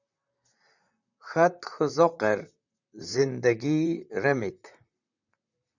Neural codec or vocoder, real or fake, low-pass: vocoder, 44.1 kHz, 128 mel bands, Pupu-Vocoder; fake; 7.2 kHz